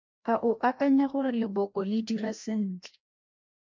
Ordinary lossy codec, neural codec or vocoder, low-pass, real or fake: MP3, 64 kbps; codec, 16 kHz, 1 kbps, FreqCodec, larger model; 7.2 kHz; fake